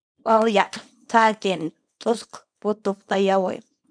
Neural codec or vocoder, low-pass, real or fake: codec, 24 kHz, 0.9 kbps, WavTokenizer, small release; 9.9 kHz; fake